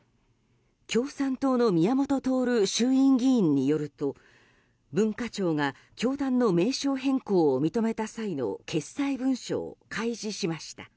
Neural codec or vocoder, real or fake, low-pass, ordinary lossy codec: none; real; none; none